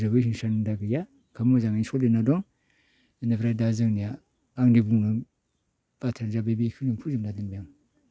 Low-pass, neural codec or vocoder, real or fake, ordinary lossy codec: none; none; real; none